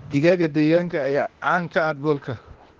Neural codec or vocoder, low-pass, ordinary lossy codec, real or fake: codec, 16 kHz, 0.8 kbps, ZipCodec; 7.2 kHz; Opus, 16 kbps; fake